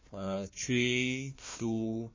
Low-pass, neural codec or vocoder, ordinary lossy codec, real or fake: 7.2 kHz; codec, 16 kHz, 1 kbps, FunCodec, trained on Chinese and English, 50 frames a second; MP3, 32 kbps; fake